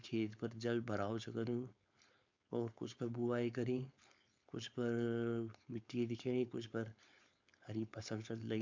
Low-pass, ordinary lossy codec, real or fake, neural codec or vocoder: 7.2 kHz; MP3, 64 kbps; fake; codec, 16 kHz, 4.8 kbps, FACodec